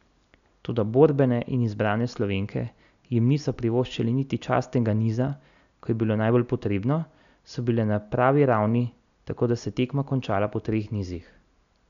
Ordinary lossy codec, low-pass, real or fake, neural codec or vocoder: none; 7.2 kHz; real; none